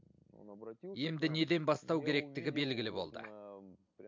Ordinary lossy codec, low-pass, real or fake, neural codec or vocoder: MP3, 64 kbps; 7.2 kHz; real; none